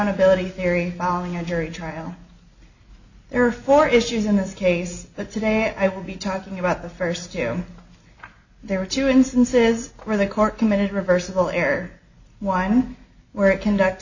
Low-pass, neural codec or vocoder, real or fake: 7.2 kHz; none; real